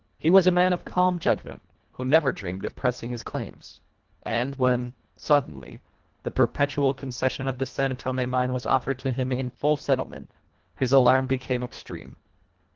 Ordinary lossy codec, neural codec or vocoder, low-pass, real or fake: Opus, 16 kbps; codec, 24 kHz, 1.5 kbps, HILCodec; 7.2 kHz; fake